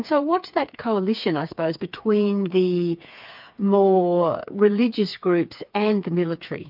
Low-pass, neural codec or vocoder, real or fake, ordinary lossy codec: 5.4 kHz; codec, 16 kHz, 4 kbps, FreqCodec, smaller model; fake; MP3, 48 kbps